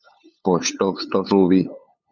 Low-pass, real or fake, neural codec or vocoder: 7.2 kHz; fake; codec, 16 kHz, 8 kbps, FunCodec, trained on LibriTTS, 25 frames a second